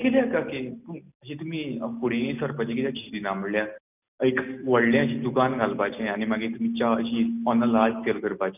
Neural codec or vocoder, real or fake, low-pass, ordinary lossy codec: none; real; 3.6 kHz; none